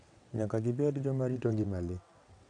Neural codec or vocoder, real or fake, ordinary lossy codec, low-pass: vocoder, 22.05 kHz, 80 mel bands, WaveNeXt; fake; none; 9.9 kHz